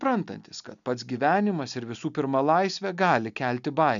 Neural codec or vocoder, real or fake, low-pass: none; real; 7.2 kHz